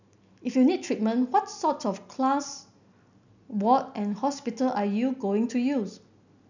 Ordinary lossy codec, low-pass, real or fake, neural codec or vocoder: none; 7.2 kHz; real; none